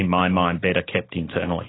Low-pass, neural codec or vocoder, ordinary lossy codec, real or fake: 7.2 kHz; none; AAC, 16 kbps; real